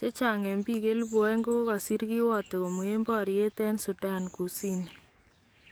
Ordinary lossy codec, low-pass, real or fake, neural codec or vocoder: none; none; fake; codec, 44.1 kHz, 7.8 kbps, DAC